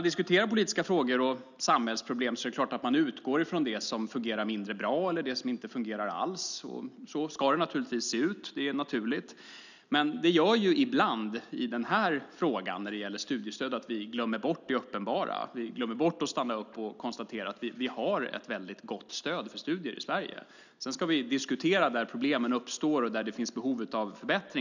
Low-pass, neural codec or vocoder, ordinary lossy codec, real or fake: 7.2 kHz; none; none; real